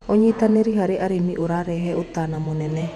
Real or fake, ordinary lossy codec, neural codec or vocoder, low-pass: fake; none; vocoder, 48 kHz, 128 mel bands, Vocos; 14.4 kHz